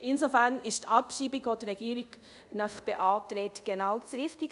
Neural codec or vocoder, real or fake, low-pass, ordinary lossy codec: codec, 24 kHz, 0.5 kbps, DualCodec; fake; 10.8 kHz; none